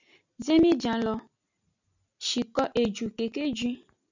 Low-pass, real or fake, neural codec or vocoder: 7.2 kHz; real; none